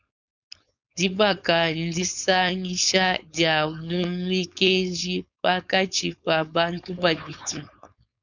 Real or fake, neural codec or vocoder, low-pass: fake; codec, 16 kHz, 4.8 kbps, FACodec; 7.2 kHz